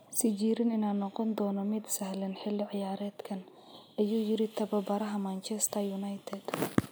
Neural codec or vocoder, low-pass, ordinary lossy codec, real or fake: none; none; none; real